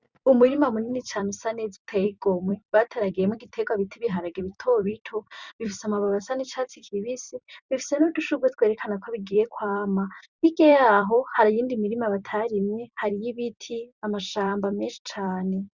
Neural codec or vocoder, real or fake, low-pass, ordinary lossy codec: vocoder, 44.1 kHz, 128 mel bands every 256 samples, BigVGAN v2; fake; 7.2 kHz; Opus, 64 kbps